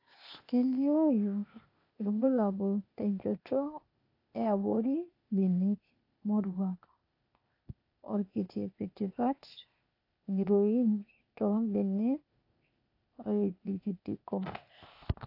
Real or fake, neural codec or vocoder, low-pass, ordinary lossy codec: fake; codec, 16 kHz, 0.8 kbps, ZipCodec; 5.4 kHz; AAC, 32 kbps